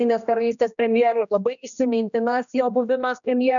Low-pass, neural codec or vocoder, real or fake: 7.2 kHz; codec, 16 kHz, 1 kbps, X-Codec, HuBERT features, trained on general audio; fake